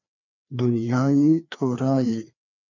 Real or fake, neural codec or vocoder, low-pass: fake; codec, 16 kHz, 2 kbps, FreqCodec, larger model; 7.2 kHz